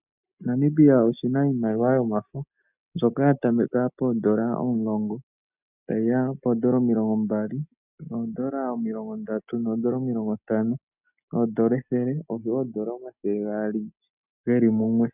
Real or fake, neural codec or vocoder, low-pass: real; none; 3.6 kHz